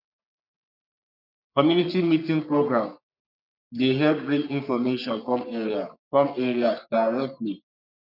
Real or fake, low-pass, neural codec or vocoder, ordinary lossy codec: fake; 5.4 kHz; codec, 44.1 kHz, 3.4 kbps, Pupu-Codec; none